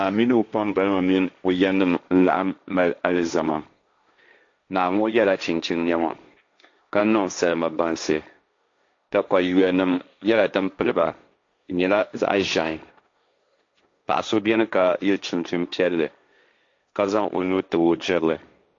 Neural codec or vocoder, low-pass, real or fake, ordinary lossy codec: codec, 16 kHz, 1.1 kbps, Voila-Tokenizer; 7.2 kHz; fake; AAC, 48 kbps